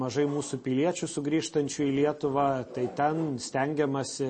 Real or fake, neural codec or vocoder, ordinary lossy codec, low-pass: real; none; MP3, 32 kbps; 9.9 kHz